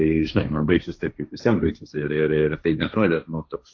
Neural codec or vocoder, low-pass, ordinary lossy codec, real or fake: codec, 16 kHz, 1.1 kbps, Voila-Tokenizer; 7.2 kHz; AAC, 48 kbps; fake